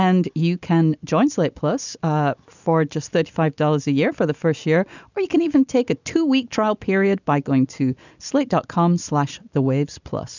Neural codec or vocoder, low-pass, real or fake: none; 7.2 kHz; real